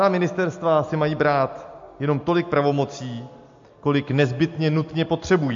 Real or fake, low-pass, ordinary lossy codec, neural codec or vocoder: real; 7.2 kHz; MP3, 48 kbps; none